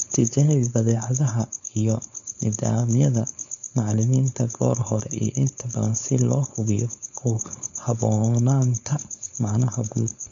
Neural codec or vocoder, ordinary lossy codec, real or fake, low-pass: codec, 16 kHz, 4.8 kbps, FACodec; none; fake; 7.2 kHz